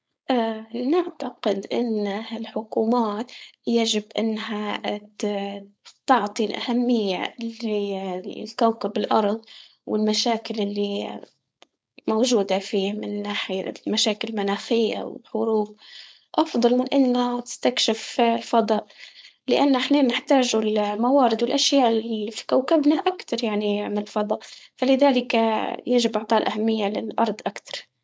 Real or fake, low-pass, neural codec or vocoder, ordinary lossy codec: fake; none; codec, 16 kHz, 4.8 kbps, FACodec; none